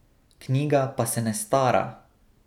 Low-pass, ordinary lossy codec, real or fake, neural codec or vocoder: 19.8 kHz; none; real; none